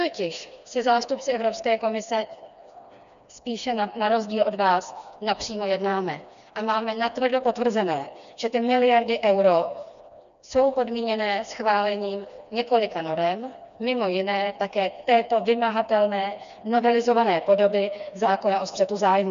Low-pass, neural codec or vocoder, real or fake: 7.2 kHz; codec, 16 kHz, 2 kbps, FreqCodec, smaller model; fake